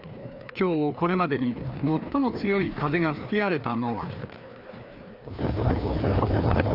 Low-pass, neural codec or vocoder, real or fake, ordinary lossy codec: 5.4 kHz; codec, 16 kHz, 2 kbps, FreqCodec, larger model; fake; none